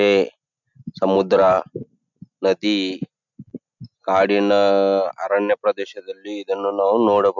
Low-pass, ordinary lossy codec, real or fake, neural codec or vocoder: 7.2 kHz; none; real; none